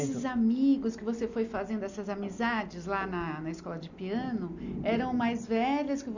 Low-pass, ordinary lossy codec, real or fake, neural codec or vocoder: 7.2 kHz; none; real; none